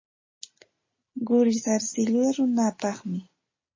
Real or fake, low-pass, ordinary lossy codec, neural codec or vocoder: real; 7.2 kHz; MP3, 32 kbps; none